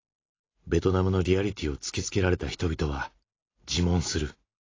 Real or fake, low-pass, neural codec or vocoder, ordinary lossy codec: real; 7.2 kHz; none; AAC, 32 kbps